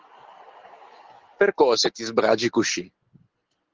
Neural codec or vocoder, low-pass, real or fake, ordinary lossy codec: none; 7.2 kHz; real; Opus, 16 kbps